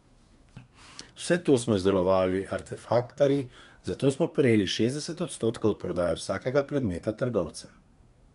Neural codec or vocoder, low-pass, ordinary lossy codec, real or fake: codec, 24 kHz, 1 kbps, SNAC; 10.8 kHz; MP3, 96 kbps; fake